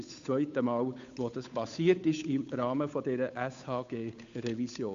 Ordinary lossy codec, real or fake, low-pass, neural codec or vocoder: AAC, 64 kbps; fake; 7.2 kHz; codec, 16 kHz, 8 kbps, FunCodec, trained on Chinese and English, 25 frames a second